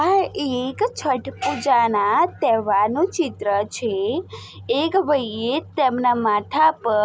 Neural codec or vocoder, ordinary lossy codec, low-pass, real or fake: none; none; none; real